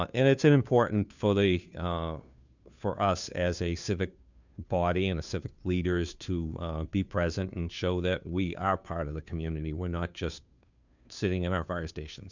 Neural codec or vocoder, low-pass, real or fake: codec, 16 kHz, 2 kbps, FunCodec, trained on Chinese and English, 25 frames a second; 7.2 kHz; fake